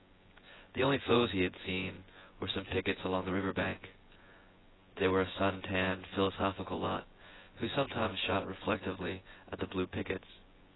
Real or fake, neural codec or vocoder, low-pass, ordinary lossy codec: fake; vocoder, 24 kHz, 100 mel bands, Vocos; 7.2 kHz; AAC, 16 kbps